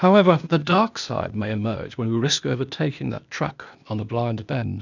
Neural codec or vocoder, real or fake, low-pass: codec, 16 kHz, 0.8 kbps, ZipCodec; fake; 7.2 kHz